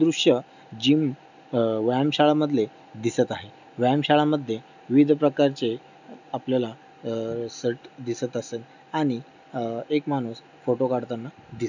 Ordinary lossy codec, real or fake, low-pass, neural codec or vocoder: none; real; 7.2 kHz; none